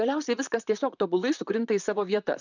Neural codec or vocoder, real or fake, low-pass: vocoder, 44.1 kHz, 128 mel bands, Pupu-Vocoder; fake; 7.2 kHz